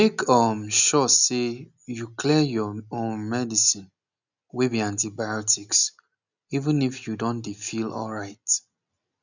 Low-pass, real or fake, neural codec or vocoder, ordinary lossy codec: 7.2 kHz; real; none; none